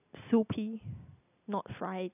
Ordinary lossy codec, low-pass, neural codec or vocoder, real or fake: none; 3.6 kHz; none; real